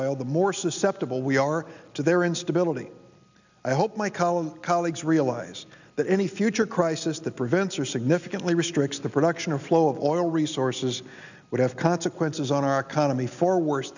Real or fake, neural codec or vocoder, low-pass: real; none; 7.2 kHz